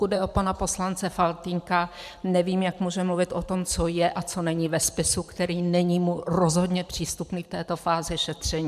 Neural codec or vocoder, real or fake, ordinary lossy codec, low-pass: vocoder, 44.1 kHz, 128 mel bands every 512 samples, BigVGAN v2; fake; MP3, 96 kbps; 14.4 kHz